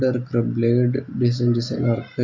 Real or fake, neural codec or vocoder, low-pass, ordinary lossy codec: real; none; 7.2 kHz; MP3, 64 kbps